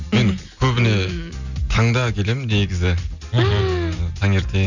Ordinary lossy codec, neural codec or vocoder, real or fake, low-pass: none; none; real; 7.2 kHz